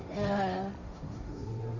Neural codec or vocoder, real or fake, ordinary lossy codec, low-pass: codec, 16 kHz, 1.1 kbps, Voila-Tokenizer; fake; none; 7.2 kHz